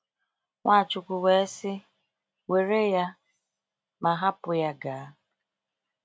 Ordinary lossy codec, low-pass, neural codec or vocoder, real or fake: none; none; none; real